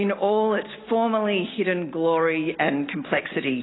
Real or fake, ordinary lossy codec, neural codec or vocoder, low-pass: real; AAC, 16 kbps; none; 7.2 kHz